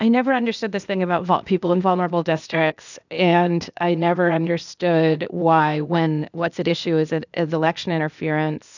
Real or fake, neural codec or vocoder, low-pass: fake; codec, 16 kHz, 0.8 kbps, ZipCodec; 7.2 kHz